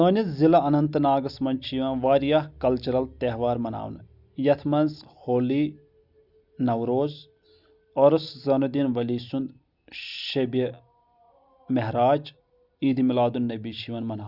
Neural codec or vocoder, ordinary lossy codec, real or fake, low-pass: none; Opus, 64 kbps; real; 5.4 kHz